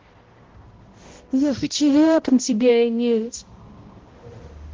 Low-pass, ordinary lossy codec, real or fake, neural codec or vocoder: 7.2 kHz; Opus, 16 kbps; fake; codec, 16 kHz, 0.5 kbps, X-Codec, HuBERT features, trained on balanced general audio